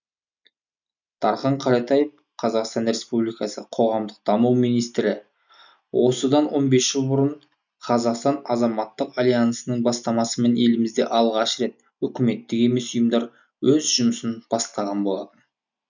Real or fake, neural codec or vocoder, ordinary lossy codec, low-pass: real; none; none; 7.2 kHz